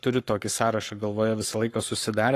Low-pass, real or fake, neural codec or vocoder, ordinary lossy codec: 14.4 kHz; fake; codec, 44.1 kHz, 7.8 kbps, Pupu-Codec; AAC, 48 kbps